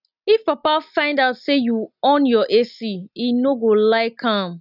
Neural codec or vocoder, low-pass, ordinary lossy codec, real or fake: none; 5.4 kHz; none; real